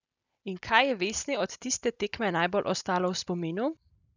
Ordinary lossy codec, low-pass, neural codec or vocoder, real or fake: none; 7.2 kHz; none; real